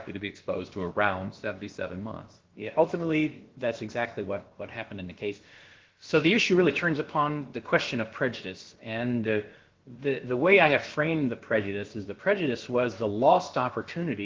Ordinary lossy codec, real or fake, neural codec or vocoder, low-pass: Opus, 16 kbps; fake; codec, 16 kHz, about 1 kbps, DyCAST, with the encoder's durations; 7.2 kHz